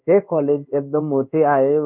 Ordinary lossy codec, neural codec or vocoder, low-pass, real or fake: none; codec, 16 kHz in and 24 kHz out, 1 kbps, XY-Tokenizer; 3.6 kHz; fake